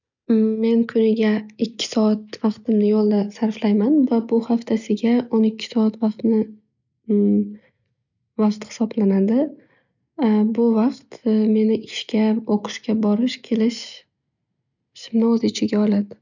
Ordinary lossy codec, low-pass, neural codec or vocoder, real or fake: none; 7.2 kHz; none; real